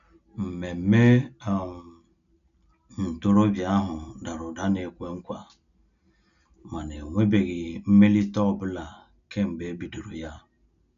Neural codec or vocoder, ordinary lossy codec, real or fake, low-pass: none; none; real; 7.2 kHz